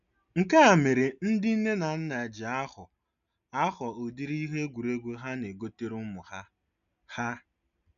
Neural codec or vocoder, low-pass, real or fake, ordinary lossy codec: none; 7.2 kHz; real; none